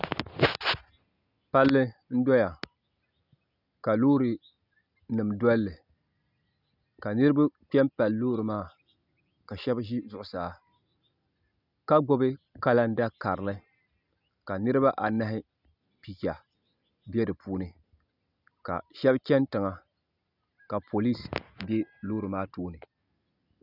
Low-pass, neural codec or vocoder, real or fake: 5.4 kHz; none; real